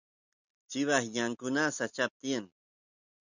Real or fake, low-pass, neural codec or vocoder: real; 7.2 kHz; none